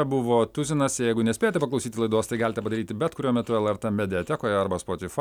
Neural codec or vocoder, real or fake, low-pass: none; real; 19.8 kHz